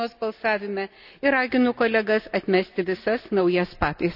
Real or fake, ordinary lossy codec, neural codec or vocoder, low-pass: real; AAC, 48 kbps; none; 5.4 kHz